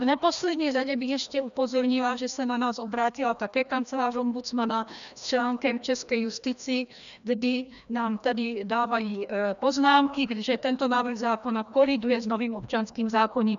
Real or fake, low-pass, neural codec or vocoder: fake; 7.2 kHz; codec, 16 kHz, 1 kbps, FreqCodec, larger model